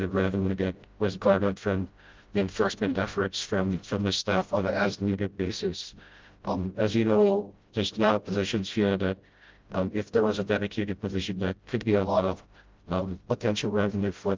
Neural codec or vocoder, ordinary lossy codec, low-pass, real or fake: codec, 16 kHz, 0.5 kbps, FreqCodec, smaller model; Opus, 32 kbps; 7.2 kHz; fake